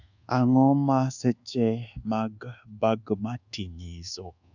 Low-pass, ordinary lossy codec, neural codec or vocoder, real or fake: 7.2 kHz; none; codec, 24 kHz, 1.2 kbps, DualCodec; fake